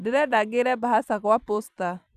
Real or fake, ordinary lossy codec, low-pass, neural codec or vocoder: fake; none; 14.4 kHz; vocoder, 44.1 kHz, 128 mel bands every 256 samples, BigVGAN v2